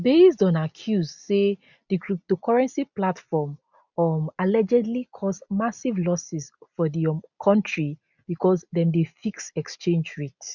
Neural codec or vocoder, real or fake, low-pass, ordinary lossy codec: none; real; 7.2 kHz; none